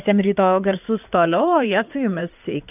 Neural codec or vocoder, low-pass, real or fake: codec, 16 kHz in and 24 kHz out, 2.2 kbps, FireRedTTS-2 codec; 3.6 kHz; fake